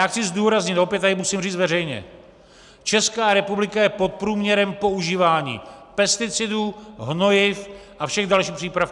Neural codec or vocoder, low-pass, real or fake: none; 10.8 kHz; real